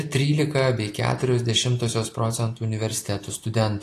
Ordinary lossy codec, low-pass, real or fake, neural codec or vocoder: AAC, 48 kbps; 14.4 kHz; real; none